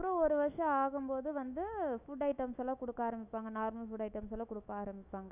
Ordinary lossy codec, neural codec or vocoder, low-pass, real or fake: none; autoencoder, 48 kHz, 128 numbers a frame, DAC-VAE, trained on Japanese speech; 3.6 kHz; fake